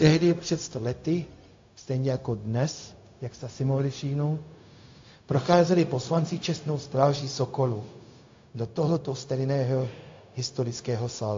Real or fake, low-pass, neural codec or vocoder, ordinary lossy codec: fake; 7.2 kHz; codec, 16 kHz, 0.4 kbps, LongCat-Audio-Codec; AAC, 48 kbps